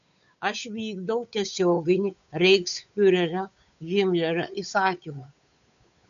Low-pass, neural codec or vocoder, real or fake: 7.2 kHz; codec, 16 kHz, 8 kbps, FunCodec, trained on Chinese and English, 25 frames a second; fake